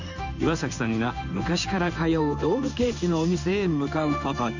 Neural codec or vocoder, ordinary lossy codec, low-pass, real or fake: codec, 16 kHz in and 24 kHz out, 1 kbps, XY-Tokenizer; none; 7.2 kHz; fake